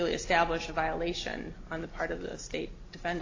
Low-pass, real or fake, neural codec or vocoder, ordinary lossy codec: 7.2 kHz; real; none; AAC, 32 kbps